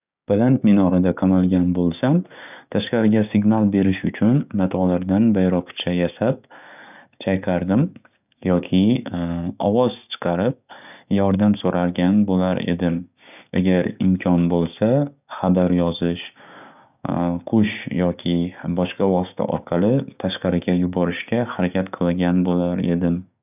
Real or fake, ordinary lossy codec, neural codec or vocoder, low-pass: fake; none; codec, 16 kHz, 6 kbps, DAC; 3.6 kHz